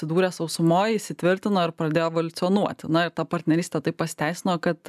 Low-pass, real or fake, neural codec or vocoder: 14.4 kHz; real; none